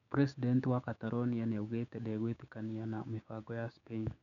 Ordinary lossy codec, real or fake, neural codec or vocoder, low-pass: none; fake; codec, 16 kHz, 6 kbps, DAC; 7.2 kHz